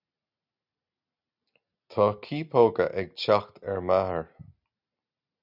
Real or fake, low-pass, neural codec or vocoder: real; 5.4 kHz; none